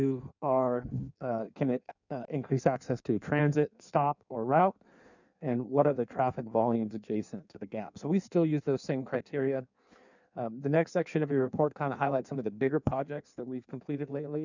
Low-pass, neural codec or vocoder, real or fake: 7.2 kHz; codec, 16 kHz in and 24 kHz out, 1.1 kbps, FireRedTTS-2 codec; fake